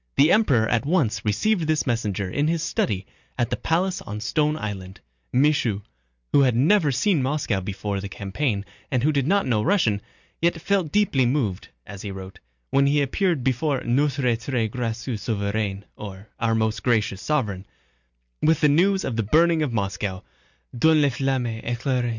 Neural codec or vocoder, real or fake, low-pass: none; real; 7.2 kHz